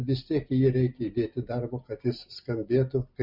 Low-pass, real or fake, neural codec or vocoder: 5.4 kHz; real; none